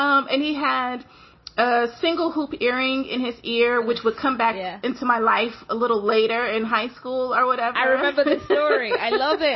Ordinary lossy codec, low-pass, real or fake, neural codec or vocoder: MP3, 24 kbps; 7.2 kHz; real; none